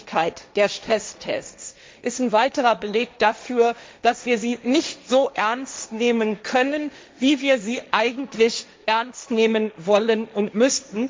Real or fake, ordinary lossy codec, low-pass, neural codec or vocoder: fake; none; none; codec, 16 kHz, 1.1 kbps, Voila-Tokenizer